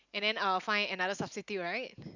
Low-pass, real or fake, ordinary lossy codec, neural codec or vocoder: 7.2 kHz; real; none; none